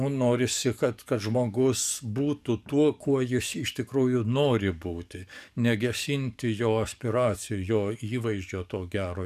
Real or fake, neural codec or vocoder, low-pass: fake; codec, 44.1 kHz, 7.8 kbps, DAC; 14.4 kHz